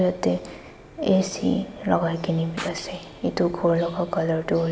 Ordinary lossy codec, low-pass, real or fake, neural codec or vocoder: none; none; real; none